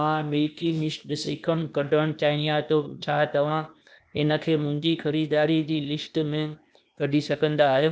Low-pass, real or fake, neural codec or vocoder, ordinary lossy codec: none; fake; codec, 16 kHz, 0.8 kbps, ZipCodec; none